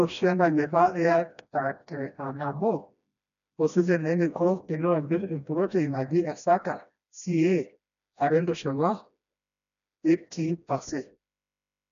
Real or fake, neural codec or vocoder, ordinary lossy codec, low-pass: fake; codec, 16 kHz, 1 kbps, FreqCodec, smaller model; none; 7.2 kHz